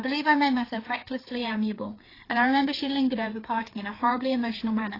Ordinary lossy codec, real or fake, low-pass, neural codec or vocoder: AAC, 24 kbps; fake; 5.4 kHz; codec, 16 kHz, 4 kbps, FreqCodec, larger model